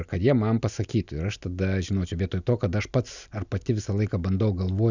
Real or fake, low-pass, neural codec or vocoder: real; 7.2 kHz; none